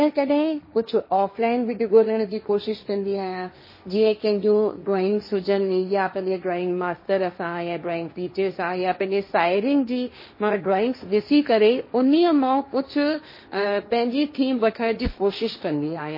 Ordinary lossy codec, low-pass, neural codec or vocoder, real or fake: MP3, 24 kbps; 5.4 kHz; codec, 16 kHz, 1.1 kbps, Voila-Tokenizer; fake